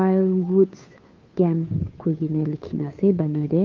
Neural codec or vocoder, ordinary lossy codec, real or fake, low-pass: codec, 16 kHz, 2 kbps, FunCodec, trained on Chinese and English, 25 frames a second; Opus, 24 kbps; fake; 7.2 kHz